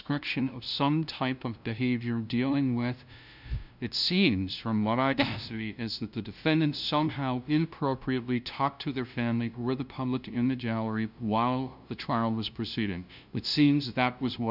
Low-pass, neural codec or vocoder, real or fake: 5.4 kHz; codec, 16 kHz, 0.5 kbps, FunCodec, trained on LibriTTS, 25 frames a second; fake